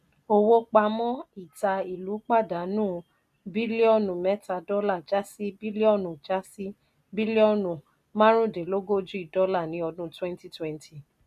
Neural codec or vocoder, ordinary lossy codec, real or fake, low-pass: none; none; real; 14.4 kHz